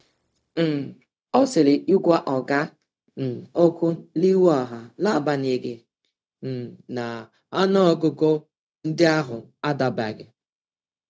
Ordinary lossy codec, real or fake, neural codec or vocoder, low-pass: none; fake; codec, 16 kHz, 0.4 kbps, LongCat-Audio-Codec; none